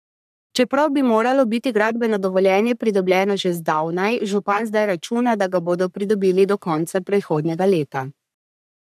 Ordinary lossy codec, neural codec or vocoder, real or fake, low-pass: none; codec, 44.1 kHz, 3.4 kbps, Pupu-Codec; fake; 14.4 kHz